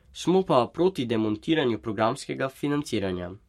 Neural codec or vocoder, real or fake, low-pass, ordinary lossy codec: codec, 44.1 kHz, 7.8 kbps, Pupu-Codec; fake; 19.8 kHz; MP3, 64 kbps